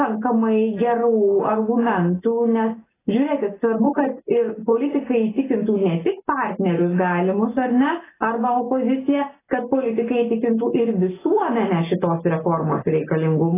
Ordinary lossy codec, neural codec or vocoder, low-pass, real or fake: AAC, 16 kbps; none; 3.6 kHz; real